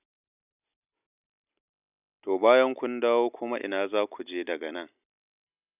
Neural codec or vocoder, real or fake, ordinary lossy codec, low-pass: none; real; none; 3.6 kHz